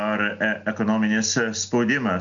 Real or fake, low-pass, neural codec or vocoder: real; 7.2 kHz; none